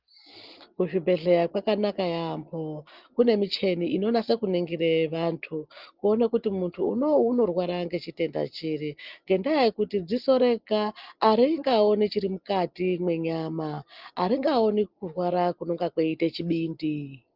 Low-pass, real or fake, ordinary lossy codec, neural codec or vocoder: 5.4 kHz; real; Opus, 24 kbps; none